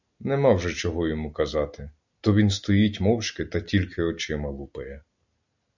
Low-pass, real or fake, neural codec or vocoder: 7.2 kHz; real; none